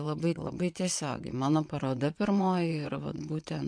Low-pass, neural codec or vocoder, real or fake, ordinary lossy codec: 9.9 kHz; vocoder, 22.05 kHz, 80 mel bands, WaveNeXt; fake; MP3, 64 kbps